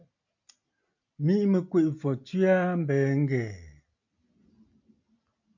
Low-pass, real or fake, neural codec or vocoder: 7.2 kHz; real; none